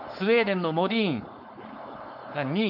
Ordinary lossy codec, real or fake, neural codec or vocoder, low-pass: AAC, 48 kbps; fake; codec, 16 kHz, 4.8 kbps, FACodec; 5.4 kHz